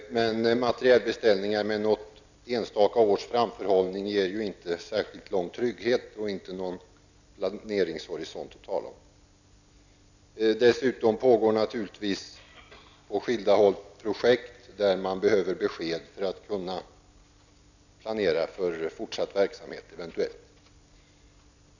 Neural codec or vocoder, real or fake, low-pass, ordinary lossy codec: none; real; 7.2 kHz; none